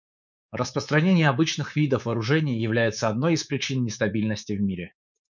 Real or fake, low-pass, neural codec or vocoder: fake; 7.2 kHz; codec, 24 kHz, 3.1 kbps, DualCodec